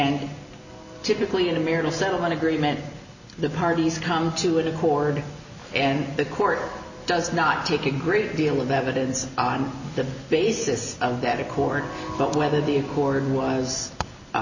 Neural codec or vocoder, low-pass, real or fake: none; 7.2 kHz; real